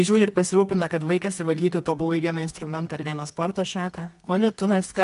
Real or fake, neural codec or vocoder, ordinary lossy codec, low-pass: fake; codec, 24 kHz, 0.9 kbps, WavTokenizer, medium music audio release; AAC, 96 kbps; 10.8 kHz